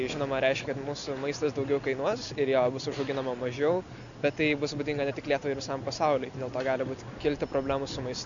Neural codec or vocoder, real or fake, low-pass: none; real; 7.2 kHz